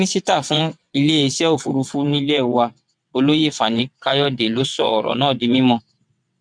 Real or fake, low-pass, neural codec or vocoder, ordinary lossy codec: fake; 9.9 kHz; vocoder, 22.05 kHz, 80 mel bands, WaveNeXt; none